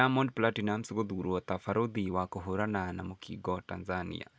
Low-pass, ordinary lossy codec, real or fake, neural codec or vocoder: none; none; real; none